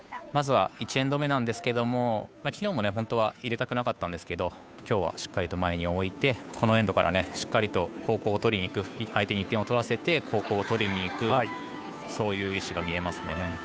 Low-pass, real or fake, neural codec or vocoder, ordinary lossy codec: none; fake; codec, 16 kHz, 2 kbps, FunCodec, trained on Chinese and English, 25 frames a second; none